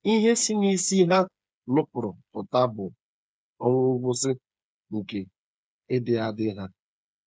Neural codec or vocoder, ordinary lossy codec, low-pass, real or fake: codec, 16 kHz, 8 kbps, FreqCodec, smaller model; none; none; fake